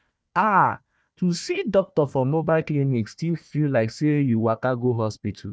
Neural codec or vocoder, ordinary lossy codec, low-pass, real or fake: codec, 16 kHz, 1 kbps, FunCodec, trained on Chinese and English, 50 frames a second; none; none; fake